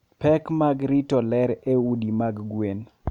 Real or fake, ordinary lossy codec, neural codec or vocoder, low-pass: real; none; none; 19.8 kHz